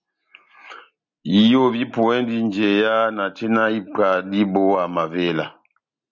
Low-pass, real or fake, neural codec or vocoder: 7.2 kHz; real; none